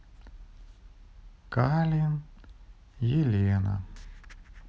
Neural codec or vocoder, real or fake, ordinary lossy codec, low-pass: none; real; none; none